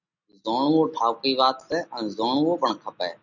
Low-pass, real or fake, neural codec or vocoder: 7.2 kHz; real; none